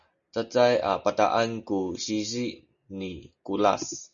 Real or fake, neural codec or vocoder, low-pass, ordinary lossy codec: real; none; 7.2 kHz; MP3, 96 kbps